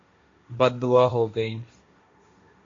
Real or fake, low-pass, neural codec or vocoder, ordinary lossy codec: fake; 7.2 kHz; codec, 16 kHz, 1.1 kbps, Voila-Tokenizer; AAC, 48 kbps